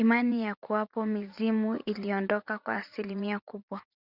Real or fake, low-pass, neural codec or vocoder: fake; 5.4 kHz; vocoder, 44.1 kHz, 80 mel bands, Vocos